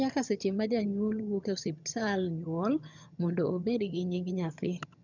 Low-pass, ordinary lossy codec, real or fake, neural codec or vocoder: 7.2 kHz; none; fake; vocoder, 22.05 kHz, 80 mel bands, HiFi-GAN